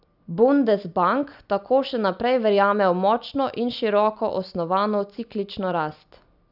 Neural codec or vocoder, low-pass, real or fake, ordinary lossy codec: none; 5.4 kHz; real; none